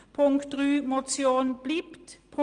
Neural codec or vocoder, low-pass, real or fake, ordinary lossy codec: none; 9.9 kHz; real; Opus, 24 kbps